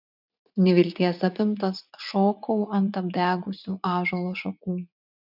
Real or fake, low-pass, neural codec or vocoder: fake; 5.4 kHz; vocoder, 44.1 kHz, 80 mel bands, Vocos